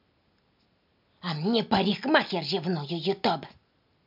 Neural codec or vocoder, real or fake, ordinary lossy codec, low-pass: none; real; MP3, 48 kbps; 5.4 kHz